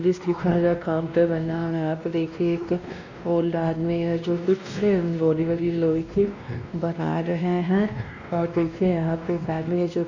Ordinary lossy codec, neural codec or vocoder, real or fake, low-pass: none; codec, 16 kHz, 1 kbps, X-Codec, WavLM features, trained on Multilingual LibriSpeech; fake; 7.2 kHz